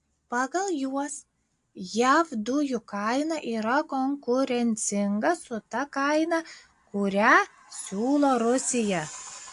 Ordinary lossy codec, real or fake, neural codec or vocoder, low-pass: AAC, 64 kbps; real; none; 10.8 kHz